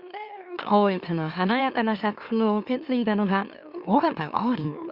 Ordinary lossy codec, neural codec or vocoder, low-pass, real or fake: Opus, 64 kbps; autoencoder, 44.1 kHz, a latent of 192 numbers a frame, MeloTTS; 5.4 kHz; fake